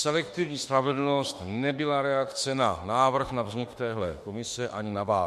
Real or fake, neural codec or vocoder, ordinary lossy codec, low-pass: fake; autoencoder, 48 kHz, 32 numbers a frame, DAC-VAE, trained on Japanese speech; MP3, 64 kbps; 14.4 kHz